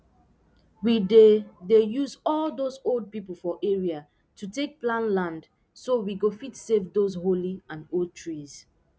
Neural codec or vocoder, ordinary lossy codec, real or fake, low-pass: none; none; real; none